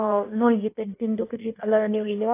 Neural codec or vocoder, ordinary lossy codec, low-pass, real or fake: codec, 16 kHz in and 24 kHz out, 1.1 kbps, FireRedTTS-2 codec; AAC, 24 kbps; 3.6 kHz; fake